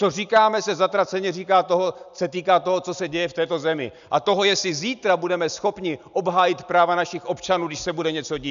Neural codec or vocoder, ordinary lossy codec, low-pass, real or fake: none; MP3, 96 kbps; 7.2 kHz; real